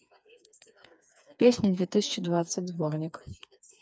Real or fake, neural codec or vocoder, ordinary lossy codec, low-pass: fake; codec, 16 kHz, 4 kbps, FreqCodec, smaller model; none; none